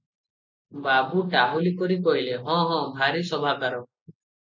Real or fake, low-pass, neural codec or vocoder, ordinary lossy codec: real; 7.2 kHz; none; MP3, 64 kbps